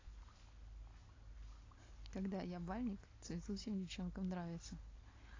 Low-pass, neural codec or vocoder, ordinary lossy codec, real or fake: 7.2 kHz; none; AAC, 32 kbps; real